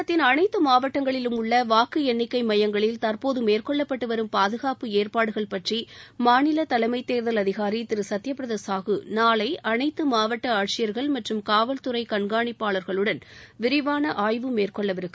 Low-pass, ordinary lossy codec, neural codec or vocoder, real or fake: none; none; none; real